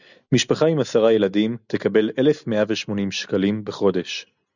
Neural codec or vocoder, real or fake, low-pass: none; real; 7.2 kHz